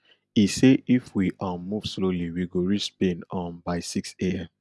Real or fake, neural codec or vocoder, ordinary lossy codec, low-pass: real; none; none; none